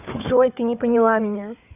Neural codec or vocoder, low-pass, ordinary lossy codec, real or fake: codec, 16 kHz, 4 kbps, FunCodec, trained on Chinese and English, 50 frames a second; 3.6 kHz; none; fake